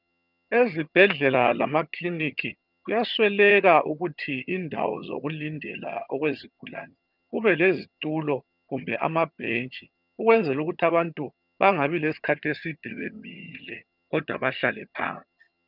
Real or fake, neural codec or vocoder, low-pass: fake; vocoder, 22.05 kHz, 80 mel bands, HiFi-GAN; 5.4 kHz